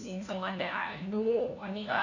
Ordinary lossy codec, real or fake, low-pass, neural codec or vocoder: none; fake; 7.2 kHz; codec, 16 kHz, 1 kbps, FunCodec, trained on LibriTTS, 50 frames a second